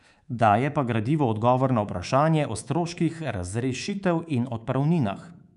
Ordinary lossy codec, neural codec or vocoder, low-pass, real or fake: none; codec, 24 kHz, 3.1 kbps, DualCodec; 10.8 kHz; fake